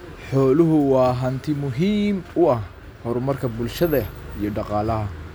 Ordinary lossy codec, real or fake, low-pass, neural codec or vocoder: none; real; none; none